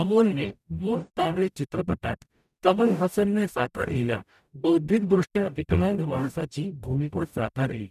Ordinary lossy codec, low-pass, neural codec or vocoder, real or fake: none; 14.4 kHz; codec, 44.1 kHz, 0.9 kbps, DAC; fake